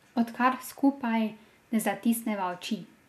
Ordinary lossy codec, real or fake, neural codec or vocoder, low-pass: MP3, 96 kbps; real; none; 14.4 kHz